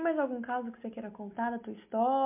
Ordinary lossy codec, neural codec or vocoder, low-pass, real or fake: MP3, 32 kbps; none; 3.6 kHz; real